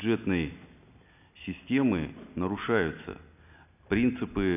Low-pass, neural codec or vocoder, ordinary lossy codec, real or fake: 3.6 kHz; none; none; real